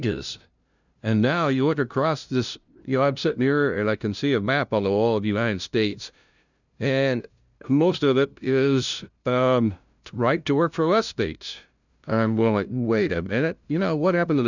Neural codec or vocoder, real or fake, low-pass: codec, 16 kHz, 0.5 kbps, FunCodec, trained on LibriTTS, 25 frames a second; fake; 7.2 kHz